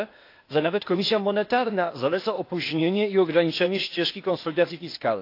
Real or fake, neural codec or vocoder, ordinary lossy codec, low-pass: fake; codec, 16 kHz, 0.8 kbps, ZipCodec; AAC, 32 kbps; 5.4 kHz